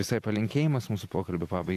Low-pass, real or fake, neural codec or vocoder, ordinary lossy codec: 14.4 kHz; real; none; AAC, 64 kbps